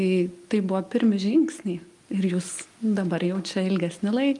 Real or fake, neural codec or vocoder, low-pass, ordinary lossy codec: real; none; 10.8 kHz; Opus, 32 kbps